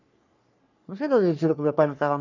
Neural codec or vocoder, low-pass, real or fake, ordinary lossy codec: codec, 44.1 kHz, 3.4 kbps, Pupu-Codec; 7.2 kHz; fake; none